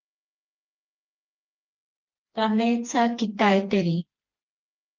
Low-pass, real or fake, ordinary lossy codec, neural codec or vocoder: 7.2 kHz; fake; Opus, 24 kbps; codec, 16 kHz, 2 kbps, FreqCodec, smaller model